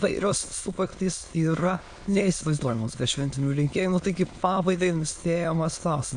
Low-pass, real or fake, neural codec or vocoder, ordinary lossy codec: 9.9 kHz; fake; autoencoder, 22.05 kHz, a latent of 192 numbers a frame, VITS, trained on many speakers; AAC, 64 kbps